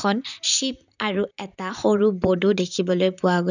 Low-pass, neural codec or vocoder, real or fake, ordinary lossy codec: 7.2 kHz; vocoder, 44.1 kHz, 128 mel bands, Pupu-Vocoder; fake; none